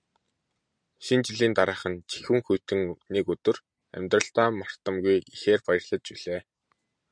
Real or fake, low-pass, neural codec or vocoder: real; 9.9 kHz; none